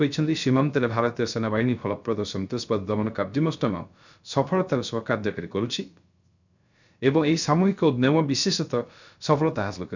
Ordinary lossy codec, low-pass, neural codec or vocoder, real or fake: none; 7.2 kHz; codec, 16 kHz, 0.3 kbps, FocalCodec; fake